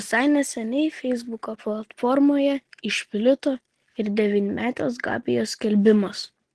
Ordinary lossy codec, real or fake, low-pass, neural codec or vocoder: Opus, 16 kbps; real; 10.8 kHz; none